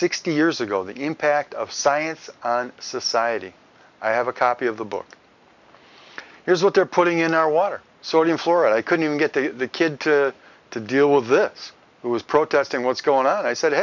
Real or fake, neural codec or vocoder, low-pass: real; none; 7.2 kHz